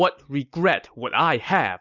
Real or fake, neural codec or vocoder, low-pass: real; none; 7.2 kHz